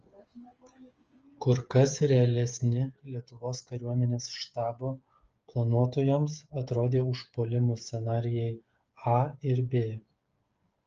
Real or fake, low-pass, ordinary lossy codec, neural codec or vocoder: fake; 7.2 kHz; Opus, 24 kbps; codec, 16 kHz, 8 kbps, FreqCodec, smaller model